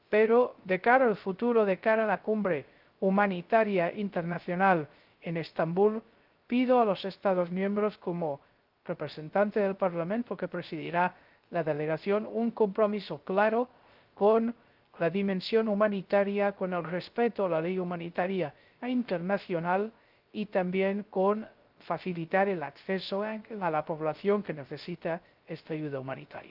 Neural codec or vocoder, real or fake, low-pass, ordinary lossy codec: codec, 16 kHz, 0.3 kbps, FocalCodec; fake; 5.4 kHz; Opus, 32 kbps